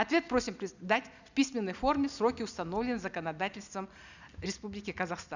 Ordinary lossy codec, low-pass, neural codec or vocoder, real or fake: none; 7.2 kHz; none; real